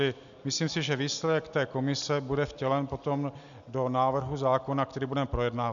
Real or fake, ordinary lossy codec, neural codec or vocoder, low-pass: real; MP3, 96 kbps; none; 7.2 kHz